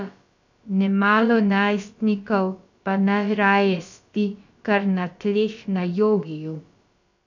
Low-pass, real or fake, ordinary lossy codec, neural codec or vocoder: 7.2 kHz; fake; none; codec, 16 kHz, about 1 kbps, DyCAST, with the encoder's durations